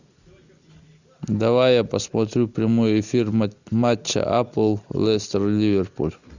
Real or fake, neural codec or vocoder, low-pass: real; none; 7.2 kHz